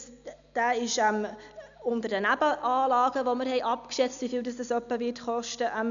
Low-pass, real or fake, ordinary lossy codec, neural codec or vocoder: 7.2 kHz; real; none; none